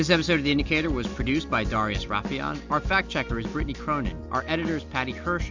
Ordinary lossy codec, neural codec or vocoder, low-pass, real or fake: MP3, 64 kbps; none; 7.2 kHz; real